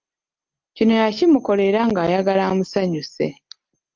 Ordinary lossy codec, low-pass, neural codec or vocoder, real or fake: Opus, 32 kbps; 7.2 kHz; none; real